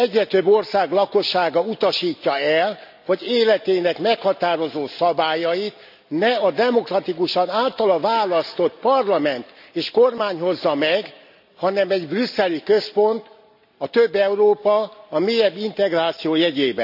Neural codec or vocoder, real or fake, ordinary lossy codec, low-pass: none; real; none; 5.4 kHz